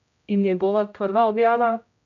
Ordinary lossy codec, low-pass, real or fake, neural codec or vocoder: MP3, 64 kbps; 7.2 kHz; fake; codec, 16 kHz, 0.5 kbps, X-Codec, HuBERT features, trained on general audio